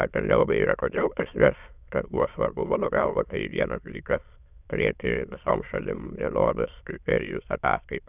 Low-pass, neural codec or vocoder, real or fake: 3.6 kHz; autoencoder, 22.05 kHz, a latent of 192 numbers a frame, VITS, trained on many speakers; fake